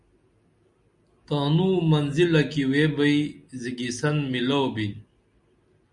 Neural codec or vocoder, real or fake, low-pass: none; real; 10.8 kHz